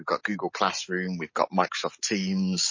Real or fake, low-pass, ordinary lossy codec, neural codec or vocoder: real; 7.2 kHz; MP3, 32 kbps; none